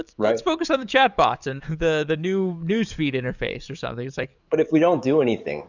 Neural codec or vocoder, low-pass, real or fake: codec, 44.1 kHz, 7.8 kbps, DAC; 7.2 kHz; fake